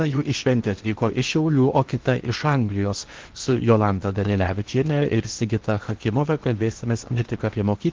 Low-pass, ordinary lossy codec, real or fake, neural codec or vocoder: 7.2 kHz; Opus, 16 kbps; fake; codec, 16 kHz in and 24 kHz out, 0.8 kbps, FocalCodec, streaming, 65536 codes